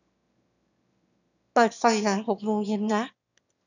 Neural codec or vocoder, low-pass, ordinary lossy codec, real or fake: autoencoder, 22.05 kHz, a latent of 192 numbers a frame, VITS, trained on one speaker; 7.2 kHz; none; fake